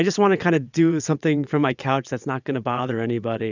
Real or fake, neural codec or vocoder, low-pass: fake; vocoder, 22.05 kHz, 80 mel bands, WaveNeXt; 7.2 kHz